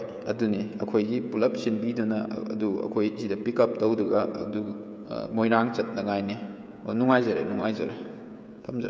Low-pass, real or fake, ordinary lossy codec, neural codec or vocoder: none; fake; none; codec, 16 kHz, 16 kbps, FreqCodec, smaller model